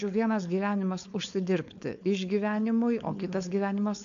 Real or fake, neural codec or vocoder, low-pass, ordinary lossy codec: fake; codec, 16 kHz, 4.8 kbps, FACodec; 7.2 kHz; AAC, 96 kbps